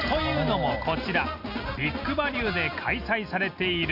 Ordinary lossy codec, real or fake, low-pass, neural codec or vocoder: none; real; 5.4 kHz; none